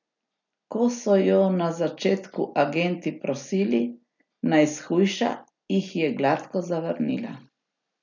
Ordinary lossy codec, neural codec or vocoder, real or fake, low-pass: none; none; real; 7.2 kHz